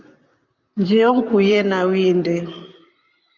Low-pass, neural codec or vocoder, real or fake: 7.2 kHz; vocoder, 44.1 kHz, 128 mel bands, Pupu-Vocoder; fake